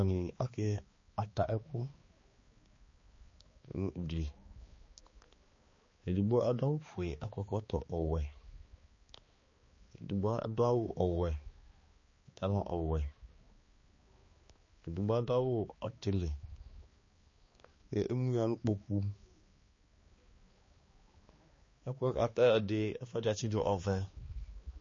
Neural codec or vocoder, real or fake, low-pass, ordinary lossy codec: codec, 16 kHz, 2 kbps, X-Codec, HuBERT features, trained on balanced general audio; fake; 7.2 kHz; MP3, 32 kbps